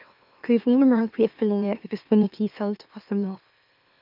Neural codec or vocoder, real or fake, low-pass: autoencoder, 44.1 kHz, a latent of 192 numbers a frame, MeloTTS; fake; 5.4 kHz